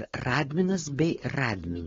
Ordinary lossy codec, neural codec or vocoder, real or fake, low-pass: AAC, 24 kbps; codec, 16 kHz, 4.8 kbps, FACodec; fake; 7.2 kHz